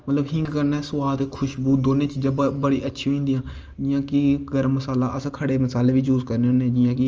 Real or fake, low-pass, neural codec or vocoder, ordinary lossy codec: real; 7.2 kHz; none; Opus, 24 kbps